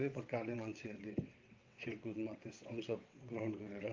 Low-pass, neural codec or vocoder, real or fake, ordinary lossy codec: 7.2 kHz; vocoder, 22.05 kHz, 80 mel bands, WaveNeXt; fake; Opus, 32 kbps